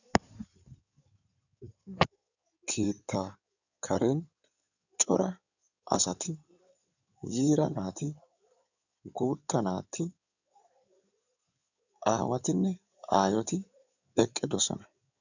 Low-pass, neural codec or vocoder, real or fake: 7.2 kHz; codec, 16 kHz in and 24 kHz out, 2.2 kbps, FireRedTTS-2 codec; fake